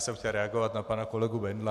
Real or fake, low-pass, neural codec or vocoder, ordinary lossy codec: real; 14.4 kHz; none; AAC, 96 kbps